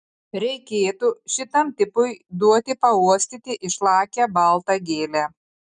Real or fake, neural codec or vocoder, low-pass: real; none; 9.9 kHz